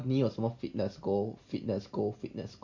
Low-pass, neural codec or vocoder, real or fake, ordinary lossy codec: 7.2 kHz; none; real; Opus, 64 kbps